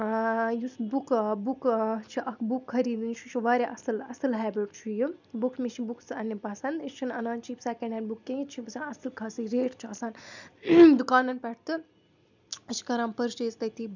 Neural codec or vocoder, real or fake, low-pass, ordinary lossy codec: none; real; 7.2 kHz; none